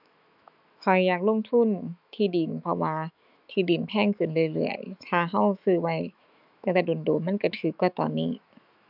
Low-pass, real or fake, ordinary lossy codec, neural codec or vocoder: 5.4 kHz; fake; none; autoencoder, 48 kHz, 128 numbers a frame, DAC-VAE, trained on Japanese speech